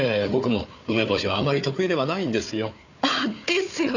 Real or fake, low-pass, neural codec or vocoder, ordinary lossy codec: fake; 7.2 kHz; codec, 16 kHz, 4 kbps, FunCodec, trained on Chinese and English, 50 frames a second; none